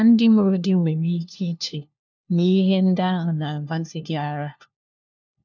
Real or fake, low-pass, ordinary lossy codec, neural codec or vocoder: fake; 7.2 kHz; none; codec, 16 kHz, 1 kbps, FunCodec, trained on LibriTTS, 50 frames a second